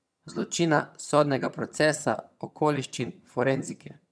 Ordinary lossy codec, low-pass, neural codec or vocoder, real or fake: none; none; vocoder, 22.05 kHz, 80 mel bands, HiFi-GAN; fake